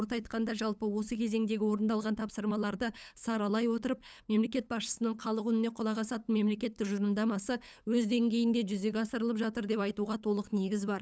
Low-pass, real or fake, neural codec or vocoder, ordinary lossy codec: none; fake; codec, 16 kHz, 8 kbps, FunCodec, trained on LibriTTS, 25 frames a second; none